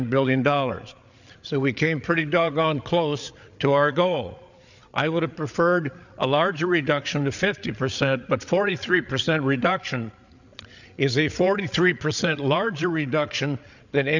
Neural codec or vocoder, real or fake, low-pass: codec, 16 kHz, 8 kbps, FreqCodec, larger model; fake; 7.2 kHz